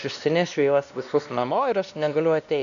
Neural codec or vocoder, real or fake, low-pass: codec, 16 kHz, 1 kbps, X-Codec, WavLM features, trained on Multilingual LibriSpeech; fake; 7.2 kHz